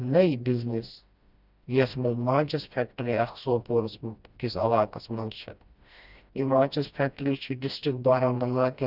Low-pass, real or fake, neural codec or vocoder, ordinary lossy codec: 5.4 kHz; fake; codec, 16 kHz, 1 kbps, FreqCodec, smaller model; Opus, 64 kbps